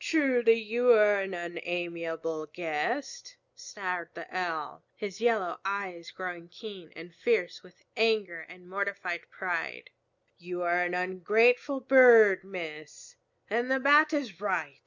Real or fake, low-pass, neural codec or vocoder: real; 7.2 kHz; none